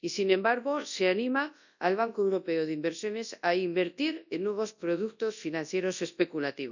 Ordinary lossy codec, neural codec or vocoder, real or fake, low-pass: none; codec, 24 kHz, 0.9 kbps, WavTokenizer, large speech release; fake; 7.2 kHz